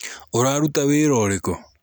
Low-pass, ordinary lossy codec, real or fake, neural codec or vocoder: none; none; real; none